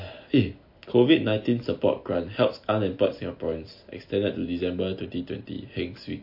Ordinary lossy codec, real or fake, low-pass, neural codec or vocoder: MP3, 32 kbps; real; 5.4 kHz; none